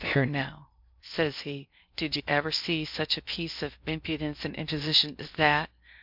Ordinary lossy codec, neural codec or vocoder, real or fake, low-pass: MP3, 48 kbps; codec, 16 kHz in and 24 kHz out, 0.8 kbps, FocalCodec, streaming, 65536 codes; fake; 5.4 kHz